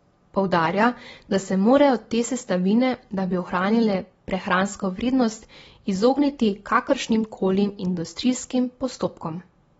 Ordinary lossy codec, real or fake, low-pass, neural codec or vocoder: AAC, 24 kbps; real; 19.8 kHz; none